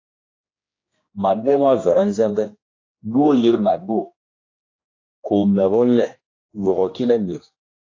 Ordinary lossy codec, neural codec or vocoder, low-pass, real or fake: AAC, 32 kbps; codec, 16 kHz, 1 kbps, X-Codec, HuBERT features, trained on general audio; 7.2 kHz; fake